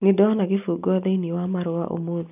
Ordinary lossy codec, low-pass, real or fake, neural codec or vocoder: none; 3.6 kHz; real; none